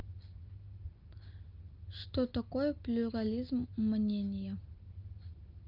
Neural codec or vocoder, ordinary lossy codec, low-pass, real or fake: none; Opus, 24 kbps; 5.4 kHz; real